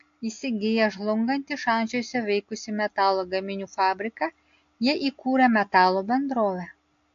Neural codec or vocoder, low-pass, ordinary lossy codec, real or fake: none; 7.2 kHz; AAC, 48 kbps; real